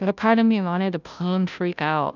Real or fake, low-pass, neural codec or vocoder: fake; 7.2 kHz; codec, 16 kHz, 0.5 kbps, FunCodec, trained on Chinese and English, 25 frames a second